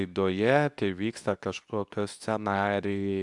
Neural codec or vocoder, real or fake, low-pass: codec, 24 kHz, 0.9 kbps, WavTokenizer, medium speech release version 2; fake; 10.8 kHz